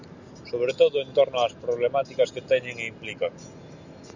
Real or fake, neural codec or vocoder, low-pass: real; none; 7.2 kHz